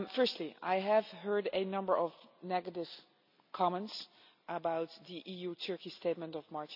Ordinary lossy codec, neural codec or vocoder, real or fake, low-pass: none; none; real; 5.4 kHz